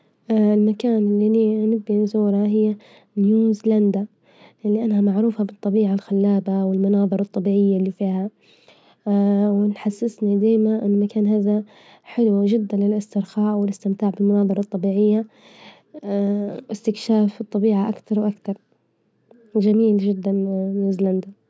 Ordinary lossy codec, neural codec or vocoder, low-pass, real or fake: none; none; none; real